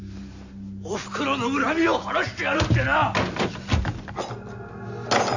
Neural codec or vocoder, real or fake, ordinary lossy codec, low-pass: none; real; none; 7.2 kHz